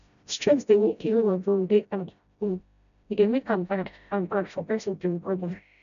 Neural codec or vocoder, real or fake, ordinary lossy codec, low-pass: codec, 16 kHz, 0.5 kbps, FreqCodec, smaller model; fake; none; 7.2 kHz